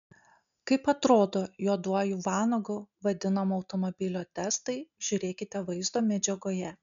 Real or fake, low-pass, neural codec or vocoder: real; 7.2 kHz; none